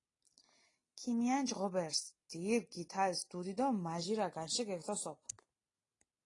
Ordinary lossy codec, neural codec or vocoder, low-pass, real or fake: AAC, 32 kbps; none; 10.8 kHz; real